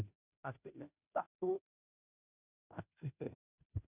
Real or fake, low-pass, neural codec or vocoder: fake; 3.6 kHz; codec, 16 kHz, 0.5 kbps, FunCodec, trained on Chinese and English, 25 frames a second